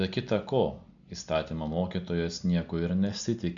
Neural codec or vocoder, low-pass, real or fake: none; 7.2 kHz; real